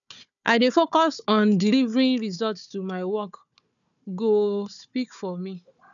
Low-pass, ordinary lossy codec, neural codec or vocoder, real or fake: 7.2 kHz; none; codec, 16 kHz, 4 kbps, FunCodec, trained on Chinese and English, 50 frames a second; fake